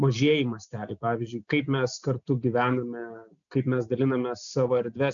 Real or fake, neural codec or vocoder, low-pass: real; none; 7.2 kHz